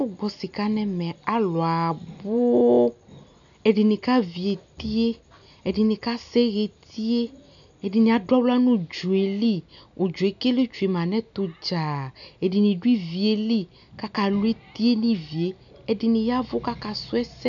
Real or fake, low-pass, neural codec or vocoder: real; 7.2 kHz; none